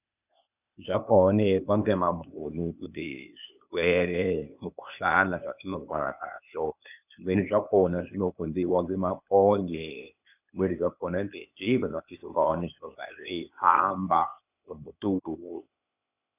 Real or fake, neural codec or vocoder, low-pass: fake; codec, 16 kHz, 0.8 kbps, ZipCodec; 3.6 kHz